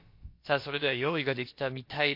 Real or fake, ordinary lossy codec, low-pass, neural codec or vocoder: fake; MP3, 32 kbps; 5.4 kHz; codec, 16 kHz, about 1 kbps, DyCAST, with the encoder's durations